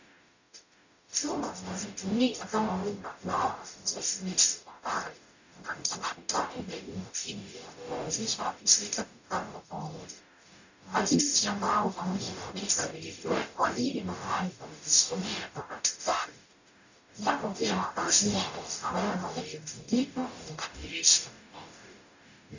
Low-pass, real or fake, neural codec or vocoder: 7.2 kHz; fake; codec, 44.1 kHz, 0.9 kbps, DAC